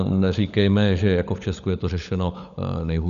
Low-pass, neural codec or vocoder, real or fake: 7.2 kHz; codec, 16 kHz, 16 kbps, FunCodec, trained on LibriTTS, 50 frames a second; fake